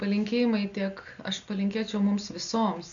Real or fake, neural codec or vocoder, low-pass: real; none; 7.2 kHz